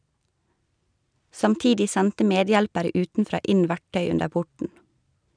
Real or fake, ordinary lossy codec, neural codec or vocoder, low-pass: real; none; none; 9.9 kHz